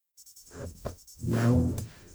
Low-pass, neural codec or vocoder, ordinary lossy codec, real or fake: none; codec, 44.1 kHz, 0.9 kbps, DAC; none; fake